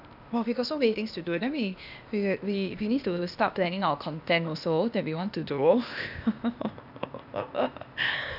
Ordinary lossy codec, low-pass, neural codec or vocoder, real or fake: none; 5.4 kHz; codec, 16 kHz, 0.8 kbps, ZipCodec; fake